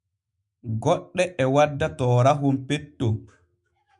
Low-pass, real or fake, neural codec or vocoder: 10.8 kHz; fake; autoencoder, 48 kHz, 128 numbers a frame, DAC-VAE, trained on Japanese speech